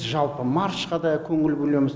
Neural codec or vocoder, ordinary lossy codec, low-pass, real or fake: none; none; none; real